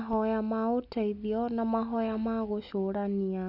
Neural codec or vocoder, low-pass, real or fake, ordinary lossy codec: none; 5.4 kHz; real; none